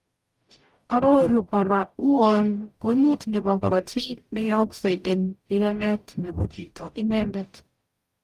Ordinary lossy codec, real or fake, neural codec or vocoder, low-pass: Opus, 32 kbps; fake; codec, 44.1 kHz, 0.9 kbps, DAC; 14.4 kHz